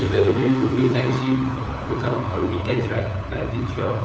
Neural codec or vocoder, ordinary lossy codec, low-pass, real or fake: codec, 16 kHz, 4 kbps, FunCodec, trained on LibriTTS, 50 frames a second; none; none; fake